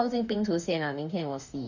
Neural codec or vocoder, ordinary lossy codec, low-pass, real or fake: autoencoder, 48 kHz, 32 numbers a frame, DAC-VAE, trained on Japanese speech; none; 7.2 kHz; fake